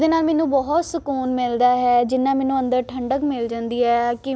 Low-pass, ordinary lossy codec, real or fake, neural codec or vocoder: none; none; real; none